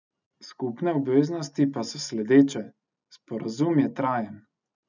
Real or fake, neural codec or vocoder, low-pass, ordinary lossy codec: real; none; 7.2 kHz; none